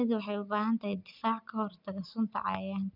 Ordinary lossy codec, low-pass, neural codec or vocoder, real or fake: none; 5.4 kHz; none; real